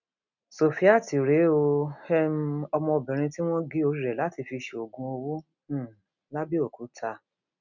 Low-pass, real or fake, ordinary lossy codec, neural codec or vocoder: 7.2 kHz; real; none; none